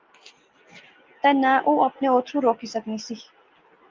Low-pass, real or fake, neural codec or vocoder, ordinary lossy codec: 7.2 kHz; real; none; Opus, 32 kbps